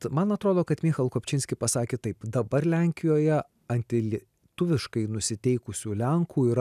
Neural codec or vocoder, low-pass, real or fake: none; 14.4 kHz; real